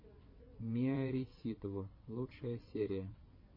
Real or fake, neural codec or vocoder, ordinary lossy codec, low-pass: fake; vocoder, 22.05 kHz, 80 mel bands, WaveNeXt; MP3, 24 kbps; 5.4 kHz